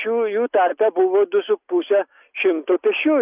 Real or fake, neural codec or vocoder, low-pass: real; none; 3.6 kHz